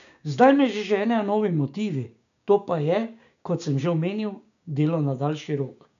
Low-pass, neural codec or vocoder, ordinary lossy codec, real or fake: 7.2 kHz; codec, 16 kHz, 6 kbps, DAC; none; fake